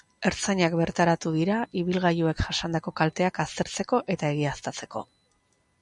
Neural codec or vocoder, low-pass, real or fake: none; 10.8 kHz; real